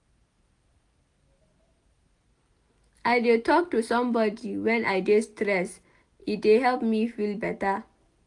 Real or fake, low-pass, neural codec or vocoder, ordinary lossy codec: real; 10.8 kHz; none; AAC, 64 kbps